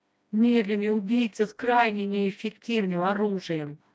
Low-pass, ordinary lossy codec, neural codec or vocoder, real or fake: none; none; codec, 16 kHz, 1 kbps, FreqCodec, smaller model; fake